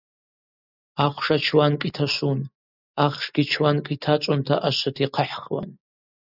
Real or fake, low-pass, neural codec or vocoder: real; 5.4 kHz; none